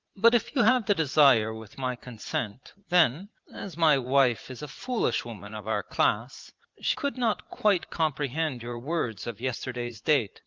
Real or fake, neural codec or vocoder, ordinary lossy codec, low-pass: fake; vocoder, 44.1 kHz, 80 mel bands, Vocos; Opus, 32 kbps; 7.2 kHz